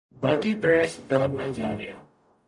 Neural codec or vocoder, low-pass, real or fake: codec, 44.1 kHz, 0.9 kbps, DAC; 10.8 kHz; fake